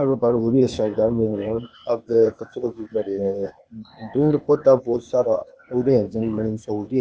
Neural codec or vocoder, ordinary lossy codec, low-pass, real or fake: codec, 16 kHz, 0.8 kbps, ZipCodec; none; none; fake